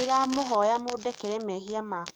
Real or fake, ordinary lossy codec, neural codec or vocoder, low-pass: fake; none; codec, 44.1 kHz, 7.8 kbps, DAC; none